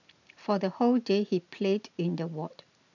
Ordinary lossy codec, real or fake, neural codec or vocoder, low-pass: none; real; none; 7.2 kHz